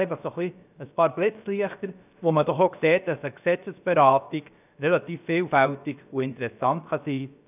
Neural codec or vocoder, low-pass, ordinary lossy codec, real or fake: codec, 16 kHz, about 1 kbps, DyCAST, with the encoder's durations; 3.6 kHz; none; fake